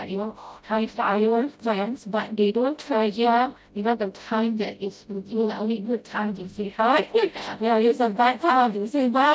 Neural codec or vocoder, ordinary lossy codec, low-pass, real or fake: codec, 16 kHz, 0.5 kbps, FreqCodec, smaller model; none; none; fake